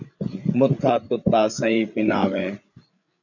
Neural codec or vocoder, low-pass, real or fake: codec, 16 kHz, 8 kbps, FreqCodec, larger model; 7.2 kHz; fake